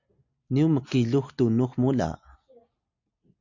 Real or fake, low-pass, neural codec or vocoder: real; 7.2 kHz; none